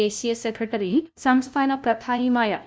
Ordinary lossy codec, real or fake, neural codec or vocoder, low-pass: none; fake; codec, 16 kHz, 0.5 kbps, FunCodec, trained on LibriTTS, 25 frames a second; none